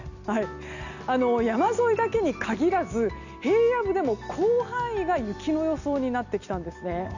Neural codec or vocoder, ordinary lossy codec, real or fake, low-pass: none; none; real; 7.2 kHz